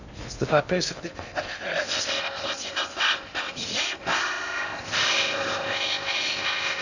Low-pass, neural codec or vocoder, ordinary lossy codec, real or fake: 7.2 kHz; codec, 16 kHz in and 24 kHz out, 0.6 kbps, FocalCodec, streaming, 2048 codes; none; fake